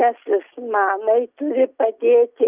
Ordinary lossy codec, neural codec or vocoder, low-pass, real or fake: Opus, 24 kbps; none; 3.6 kHz; real